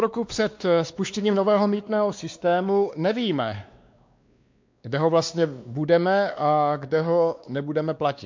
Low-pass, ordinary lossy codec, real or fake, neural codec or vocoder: 7.2 kHz; MP3, 64 kbps; fake; codec, 16 kHz, 2 kbps, X-Codec, WavLM features, trained on Multilingual LibriSpeech